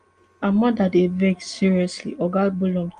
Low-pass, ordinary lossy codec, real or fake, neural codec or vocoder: 9.9 kHz; Opus, 32 kbps; real; none